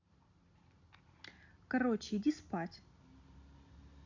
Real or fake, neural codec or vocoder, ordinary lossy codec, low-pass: real; none; none; 7.2 kHz